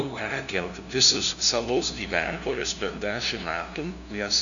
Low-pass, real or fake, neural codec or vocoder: 7.2 kHz; fake; codec, 16 kHz, 0.5 kbps, FunCodec, trained on LibriTTS, 25 frames a second